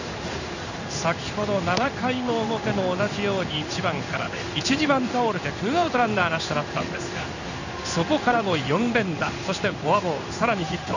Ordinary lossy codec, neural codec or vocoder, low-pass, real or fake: none; codec, 16 kHz in and 24 kHz out, 1 kbps, XY-Tokenizer; 7.2 kHz; fake